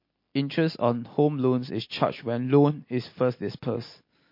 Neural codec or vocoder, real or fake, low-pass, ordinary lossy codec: none; real; 5.4 kHz; MP3, 32 kbps